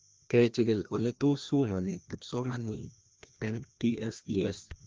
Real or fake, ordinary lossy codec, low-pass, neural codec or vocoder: fake; Opus, 32 kbps; 7.2 kHz; codec, 16 kHz, 1 kbps, FreqCodec, larger model